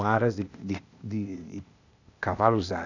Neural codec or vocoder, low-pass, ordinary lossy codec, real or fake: codec, 16 kHz, 0.8 kbps, ZipCodec; 7.2 kHz; none; fake